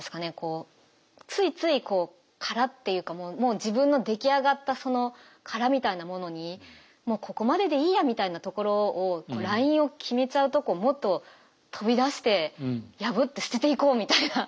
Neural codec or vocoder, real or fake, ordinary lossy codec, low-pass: none; real; none; none